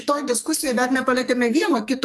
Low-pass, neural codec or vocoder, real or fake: 14.4 kHz; codec, 44.1 kHz, 2.6 kbps, SNAC; fake